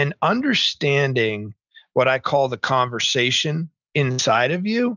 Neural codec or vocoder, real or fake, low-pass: none; real; 7.2 kHz